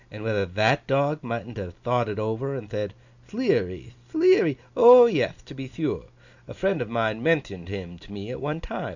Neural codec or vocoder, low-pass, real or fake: none; 7.2 kHz; real